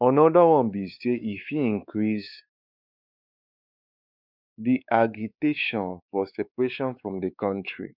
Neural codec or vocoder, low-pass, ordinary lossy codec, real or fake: codec, 16 kHz, 4 kbps, X-Codec, WavLM features, trained on Multilingual LibriSpeech; 5.4 kHz; none; fake